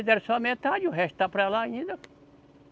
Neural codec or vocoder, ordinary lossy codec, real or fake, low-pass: none; none; real; none